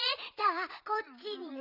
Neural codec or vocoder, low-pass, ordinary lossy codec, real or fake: vocoder, 22.05 kHz, 80 mel bands, Vocos; 5.4 kHz; none; fake